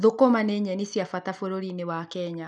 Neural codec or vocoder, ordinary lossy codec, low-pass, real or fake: none; none; 10.8 kHz; real